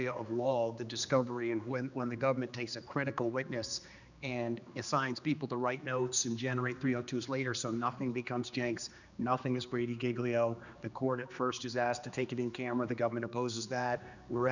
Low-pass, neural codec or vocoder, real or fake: 7.2 kHz; codec, 16 kHz, 2 kbps, X-Codec, HuBERT features, trained on general audio; fake